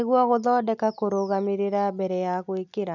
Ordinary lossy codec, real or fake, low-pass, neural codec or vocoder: none; real; 7.2 kHz; none